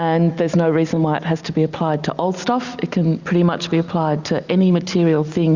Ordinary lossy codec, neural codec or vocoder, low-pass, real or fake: Opus, 64 kbps; none; 7.2 kHz; real